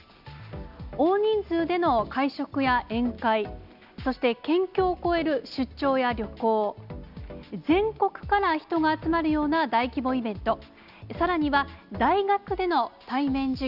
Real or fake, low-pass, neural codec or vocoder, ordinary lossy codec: real; 5.4 kHz; none; none